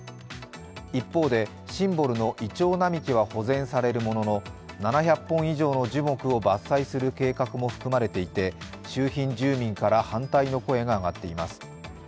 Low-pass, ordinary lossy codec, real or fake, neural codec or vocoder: none; none; real; none